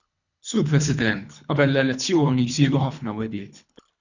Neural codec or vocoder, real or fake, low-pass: codec, 24 kHz, 3 kbps, HILCodec; fake; 7.2 kHz